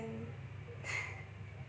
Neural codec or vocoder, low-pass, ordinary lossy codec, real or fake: none; none; none; real